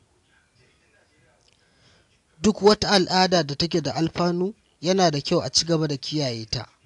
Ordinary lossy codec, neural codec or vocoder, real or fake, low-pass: AAC, 64 kbps; none; real; 10.8 kHz